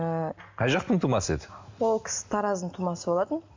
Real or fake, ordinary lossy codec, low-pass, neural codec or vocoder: real; MP3, 48 kbps; 7.2 kHz; none